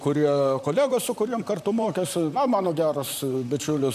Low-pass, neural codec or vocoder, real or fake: 14.4 kHz; vocoder, 44.1 kHz, 128 mel bands, Pupu-Vocoder; fake